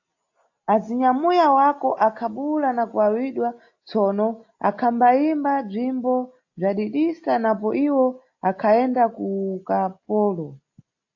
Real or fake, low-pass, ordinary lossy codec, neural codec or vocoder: real; 7.2 kHz; AAC, 48 kbps; none